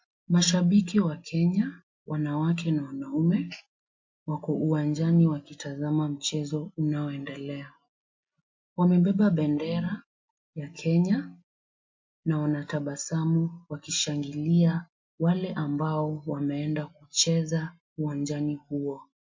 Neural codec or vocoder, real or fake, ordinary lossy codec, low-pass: none; real; MP3, 48 kbps; 7.2 kHz